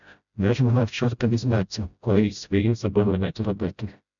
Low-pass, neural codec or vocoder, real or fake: 7.2 kHz; codec, 16 kHz, 0.5 kbps, FreqCodec, smaller model; fake